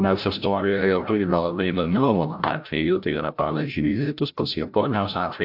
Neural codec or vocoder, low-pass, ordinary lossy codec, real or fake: codec, 16 kHz, 0.5 kbps, FreqCodec, larger model; 5.4 kHz; AAC, 48 kbps; fake